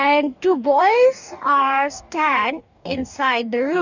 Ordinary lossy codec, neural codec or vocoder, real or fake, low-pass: none; codec, 44.1 kHz, 2.6 kbps, DAC; fake; 7.2 kHz